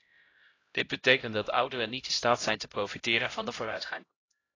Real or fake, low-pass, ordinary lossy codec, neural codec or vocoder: fake; 7.2 kHz; AAC, 32 kbps; codec, 16 kHz, 0.5 kbps, X-Codec, HuBERT features, trained on LibriSpeech